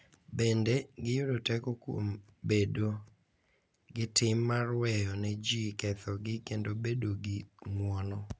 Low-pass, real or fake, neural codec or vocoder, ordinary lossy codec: none; real; none; none